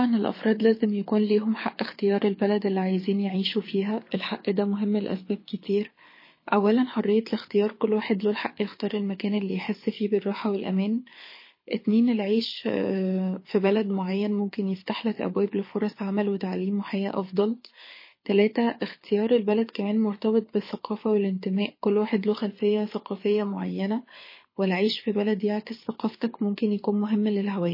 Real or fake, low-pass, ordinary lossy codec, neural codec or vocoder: fake; 5.4 kHz; MP3, 24 kbps; codec, 24 kHz, 6 kbps, HILCodec